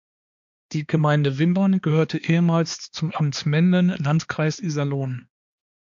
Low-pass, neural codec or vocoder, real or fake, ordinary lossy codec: 7.2 kHz; codec, 16 kHz, 2 kbps, X-Codec, HuBERT features, trained on balanced general audio; fake; AAC, 64 kbps